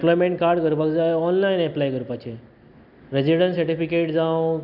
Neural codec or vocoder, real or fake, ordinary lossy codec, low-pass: none; real; none; 5.4 kHz